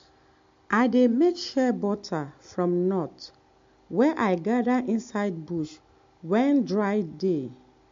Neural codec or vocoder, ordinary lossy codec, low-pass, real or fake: none; MP3, 48 kbps; 7.2 kHz; real